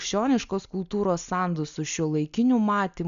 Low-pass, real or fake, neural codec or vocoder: 7.2 kHz; real; none